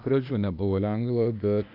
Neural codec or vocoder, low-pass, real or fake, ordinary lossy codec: codec, 16 kHz, 0.8 kbps, ZipCodec; 5.4 kHz; fake; AAC, 48 kbps